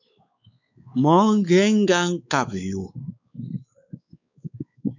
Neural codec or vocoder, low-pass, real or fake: codec, 16 kHz, 4 kbps, X-Codec, WavLM features, trained on Multilingual LibriSpeech; 7.2 kHz; fake